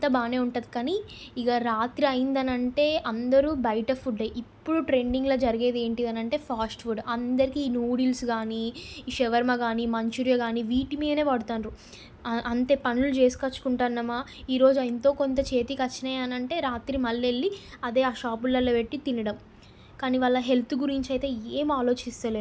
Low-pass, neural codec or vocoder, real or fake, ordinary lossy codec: none; none; real; none